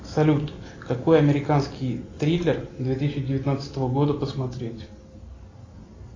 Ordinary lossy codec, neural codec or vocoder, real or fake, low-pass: AAC, 32 kbps; none; real; 7.2 kHz